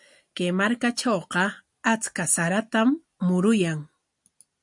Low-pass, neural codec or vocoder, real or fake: 10.8 kHz; none; real